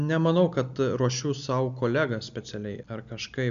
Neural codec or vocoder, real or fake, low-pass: none; real; 7.2 kHz